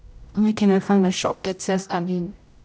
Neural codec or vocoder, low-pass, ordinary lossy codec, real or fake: codec, 16 kHz, 0.5 kbps, X-Codec, HuBERT features, trained on general audio; none; none; fake